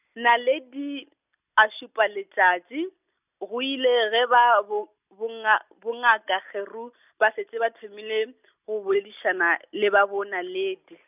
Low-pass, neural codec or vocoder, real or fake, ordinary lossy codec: 3.6 kHz; none; real; none